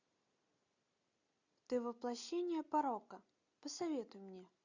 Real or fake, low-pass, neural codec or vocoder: real; 7.2 kHz; none